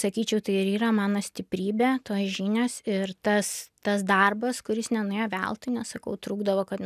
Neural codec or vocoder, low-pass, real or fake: none; 14.4 kHz; real